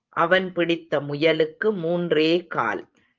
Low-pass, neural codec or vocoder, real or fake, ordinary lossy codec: 7.2 kHz; vocoder, 24 kHz, 100 mel bands, Vocos; fake; Opus, 24 kbps